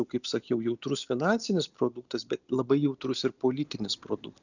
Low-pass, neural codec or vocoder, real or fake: 7.2 kHz; none; real